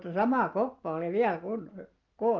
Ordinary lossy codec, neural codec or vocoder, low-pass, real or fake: Opus, 32 kbps; none; 7.2 kHz; real